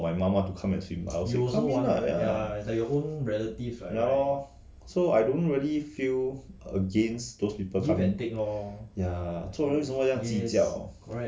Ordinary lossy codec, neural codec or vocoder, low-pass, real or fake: none; none; none; real